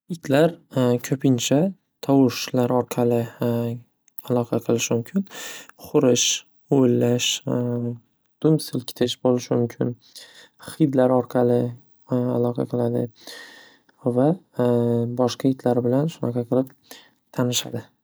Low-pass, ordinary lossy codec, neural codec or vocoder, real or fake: none; none; none; real